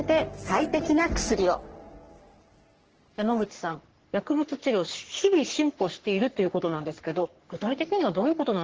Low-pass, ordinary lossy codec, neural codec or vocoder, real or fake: 7.2 kHz; Opus, 16 kbps; codec, 44.1 kHz, 3.4 kbps, Pupu-Codec; fake